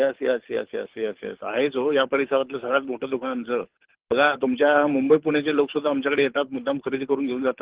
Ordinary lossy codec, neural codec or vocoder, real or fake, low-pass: Opus, 16 kbps; codec, 24 kHz, 6 kbps, HILCodec; fake; 3.6 kHz